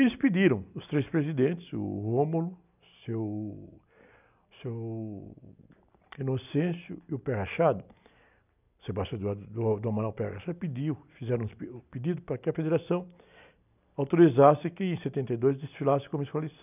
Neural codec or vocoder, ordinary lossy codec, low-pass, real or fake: none; none; 3.6 kHz; real